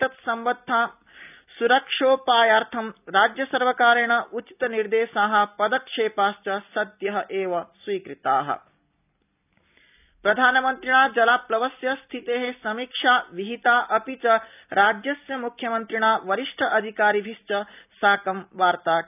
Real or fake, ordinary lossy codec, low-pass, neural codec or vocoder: real; none; 3.6 kHz; none